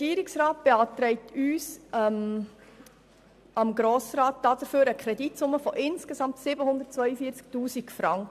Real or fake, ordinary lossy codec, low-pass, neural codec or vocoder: real; AAC, 96 kbps; 14.4 kHz; none